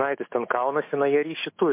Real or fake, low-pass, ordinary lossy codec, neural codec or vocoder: fake; 3.6 kHz; MP3, 24 kbps; autoencoder, 48 kHz, 128 numbers a frame, DAC-VAE, trained on Japanese speech